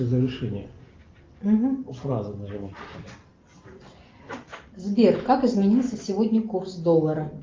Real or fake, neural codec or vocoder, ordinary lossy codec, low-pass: real; none; Opus, 32 kbps; 7.2 kHz